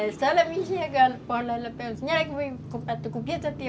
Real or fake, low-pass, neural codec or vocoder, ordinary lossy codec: real; none; none; none